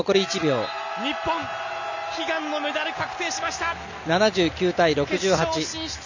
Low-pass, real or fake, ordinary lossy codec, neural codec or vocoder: 7.2 kHz; real; none; none